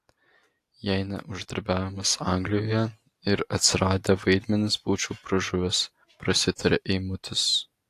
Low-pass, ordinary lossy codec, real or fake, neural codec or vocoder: 14.4 kHz; AAC, 64 kbps; real; none